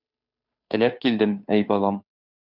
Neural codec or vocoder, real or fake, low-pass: codec, 16 kHz, 2 kbps, FunCodec, trained on Chinese and English, 25 frames a second; fake; 5.4 kHz